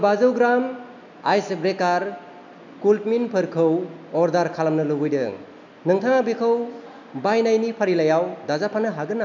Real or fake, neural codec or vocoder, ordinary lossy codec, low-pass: real; none; none; 7.2 kHz